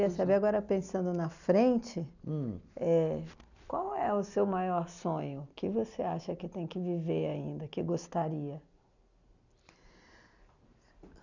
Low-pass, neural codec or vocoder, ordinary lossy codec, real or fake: 7.2 kHz; none; none; real